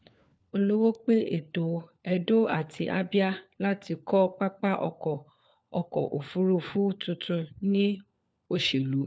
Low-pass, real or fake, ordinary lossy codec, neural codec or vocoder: none; fake; none; codec, 16 kHz, 4 kbps, FunCodec, trained on LibriTTS, 50 frames a second